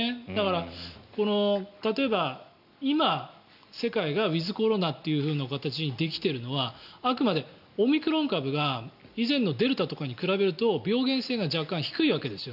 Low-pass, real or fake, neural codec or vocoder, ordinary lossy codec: 5.4 kHz; real; none; none